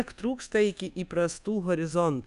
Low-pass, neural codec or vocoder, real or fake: 10.8 kHz; codec, 24 kHz, 1.2 kbps, DualCodec; fake